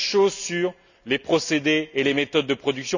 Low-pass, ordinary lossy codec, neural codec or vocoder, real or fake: 7.2 kHz; none; none; real